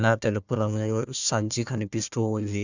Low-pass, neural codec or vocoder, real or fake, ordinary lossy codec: 7.2 kHz; codec, 16 kHz, 1 kbps, FunCodec, trained on Chinese and English, 50 frames a second; fake; none